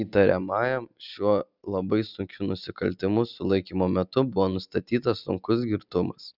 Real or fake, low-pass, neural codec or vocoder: real; 5.4 kHz; none